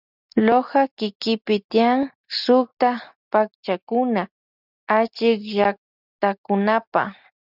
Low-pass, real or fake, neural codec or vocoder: 5.4 kHz; real; none